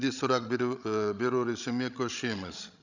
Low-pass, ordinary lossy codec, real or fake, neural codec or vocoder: 7.2 kHz; none; fake; codec, 16 kHz, 16 kbps, FreqCodec, larger model